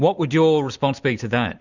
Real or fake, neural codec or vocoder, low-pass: real; none; 7.2 kHz